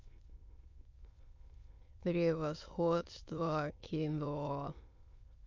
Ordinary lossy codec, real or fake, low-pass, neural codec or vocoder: MP3, 48 kbps; fake; 7.2 kHz; autoencoder, 22.05 kHz, a latent of 192 numbers a frame, VITS, trained on many speakers